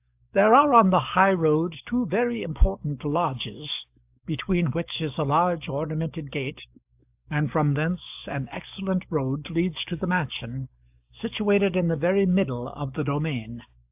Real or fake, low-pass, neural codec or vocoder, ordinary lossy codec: fake; 3.6 kHz; codec, 44.1 kHz, 7.8 kbps, DAC; AAC, 32 kbps